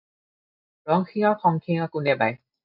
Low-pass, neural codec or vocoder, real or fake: 5.4 kHz; none; real